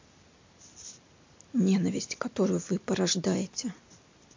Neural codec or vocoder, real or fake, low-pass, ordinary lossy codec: none; real; 7.2 kHz; MP3, 48 kbps